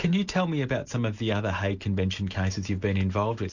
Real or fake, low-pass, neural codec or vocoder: real; 7.2 kHz; none